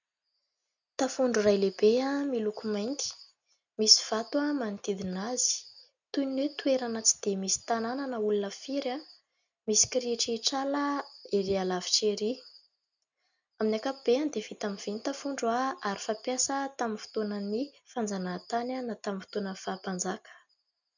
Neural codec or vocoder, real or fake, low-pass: none; real; 7.2 kHz